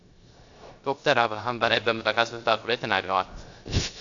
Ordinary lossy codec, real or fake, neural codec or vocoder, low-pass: AAC, 64 kbps; fake; codec, 16 kHz, 0.3 kbps, FocalCodec; 7.2 kHz